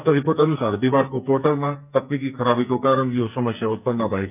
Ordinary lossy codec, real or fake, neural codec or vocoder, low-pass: none; fake; codec, 44.1 kHz, 2.6 kbps, SNAC; 3.6 kHz